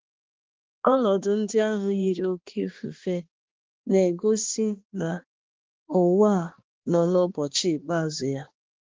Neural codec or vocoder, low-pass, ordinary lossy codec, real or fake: codec, 16 kHz, 2 kbps, X-Codec, HuBERT features, trained on balanced general audio; 7.2 kHz; Opus, 16 kbps; fake